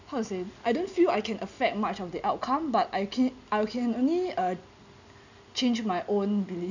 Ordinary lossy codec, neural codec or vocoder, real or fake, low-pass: none; none; real; 7.2 kHz